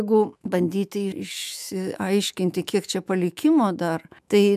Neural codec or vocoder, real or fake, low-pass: autoencoder, 48 kHz, 128 numbers a frame, DAC-VAE, trained on Japanese speech; fake; 14.4 kHz